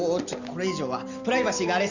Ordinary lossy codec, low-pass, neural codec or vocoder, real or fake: none; 7.2 kHz; none; real